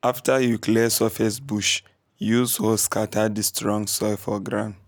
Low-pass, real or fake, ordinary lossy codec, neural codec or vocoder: none; real; none; none